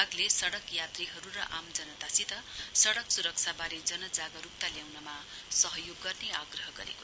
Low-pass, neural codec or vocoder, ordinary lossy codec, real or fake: none; none; none; real